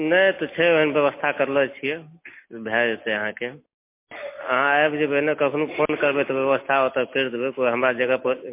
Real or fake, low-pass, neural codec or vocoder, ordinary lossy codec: real; 3.6 kHz; none; MP3, 24 kbps